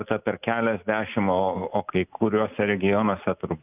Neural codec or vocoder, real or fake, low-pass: none; real; 3.6 kHz